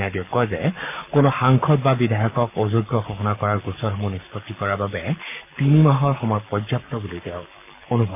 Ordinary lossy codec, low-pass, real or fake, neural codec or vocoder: AAC, 32 kbps; 3.6 kHz; fake; codec, 44.1 kHz, 7.8 kbps, Pupu-Codec